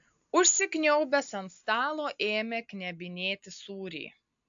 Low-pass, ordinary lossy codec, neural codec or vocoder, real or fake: 7.2 kHz; AAC, 64 kbps; none; real